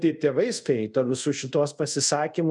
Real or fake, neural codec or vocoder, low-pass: fake; codec, 24 kHz, 0.5 kbps, DualCodec; 10.8 kHz